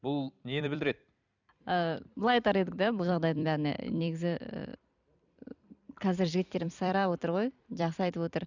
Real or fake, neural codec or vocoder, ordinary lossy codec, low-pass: fake; vocoder, 44.1 kHz, 128 mel bands every 256 samples, BigVGAN v2; none; 7.2 kHz